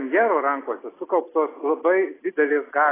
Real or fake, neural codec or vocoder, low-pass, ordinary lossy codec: real; none; 3.6 kHz; AAC, 16 kbps